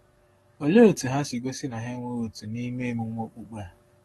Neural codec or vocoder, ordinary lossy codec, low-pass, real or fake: codec, 44.1 kHz, 7.8 kbps, DAC; AAC, 32 kbps; 19.8 kHz; fake